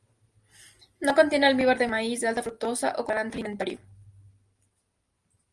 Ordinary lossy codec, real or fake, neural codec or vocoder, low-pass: Opus, 24 kbps; real; none; 10.8 kHz